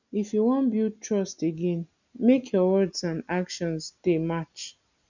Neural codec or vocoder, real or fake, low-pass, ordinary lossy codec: none; real; 7.2 kHz; none